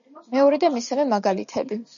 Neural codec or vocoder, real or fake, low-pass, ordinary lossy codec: none; real; 7.2 kHz; MP3, 96 kbps